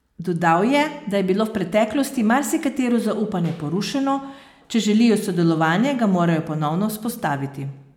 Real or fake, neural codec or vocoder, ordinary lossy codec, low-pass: real; none; none; 19.8 kHz